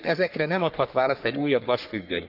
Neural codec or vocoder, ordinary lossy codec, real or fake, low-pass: codec, 44.1 kHz, 3.4 kbps, Pupu-Codec; none; fake; 5.4 kHz